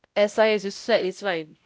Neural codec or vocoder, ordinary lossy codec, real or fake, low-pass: codec, 16 kHz, 0.5 kbps, X-Codec, WavLM features, trained on Multilingual LibriSpeech; none; fake; none